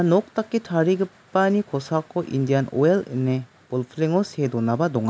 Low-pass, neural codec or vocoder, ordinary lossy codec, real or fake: none; none; none; real